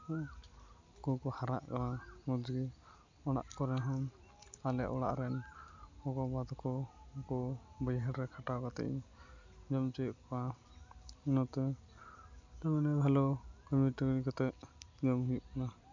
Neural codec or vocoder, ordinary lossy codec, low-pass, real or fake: none; MP3, 64 kbps; 7.2 kHz; real